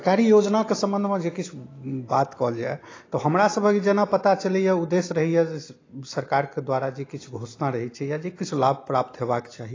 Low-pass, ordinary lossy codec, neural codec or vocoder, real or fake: 7.2 kHz; AAC, 32 kbps; none; real